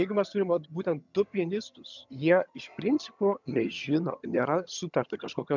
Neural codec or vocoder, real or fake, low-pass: vocoder, 22.05 kHz, 80 mel bands, HiFi-GAN; fake; 7.2 kHz